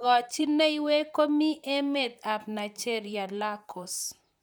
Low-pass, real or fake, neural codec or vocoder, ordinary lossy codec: none; fake; vocoder, 44.1 kHz, 128 mel bands, Pupu-Vocoder; none